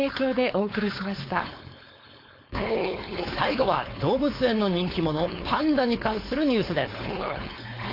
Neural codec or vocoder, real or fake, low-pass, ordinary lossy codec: codec, 16 kHz, 4.8 kbps, FACodec; fake; 5.4 kHz; AAC, 32 kbps